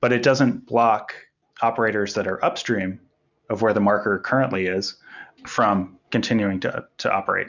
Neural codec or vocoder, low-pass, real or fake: none; 7.2 kHz; real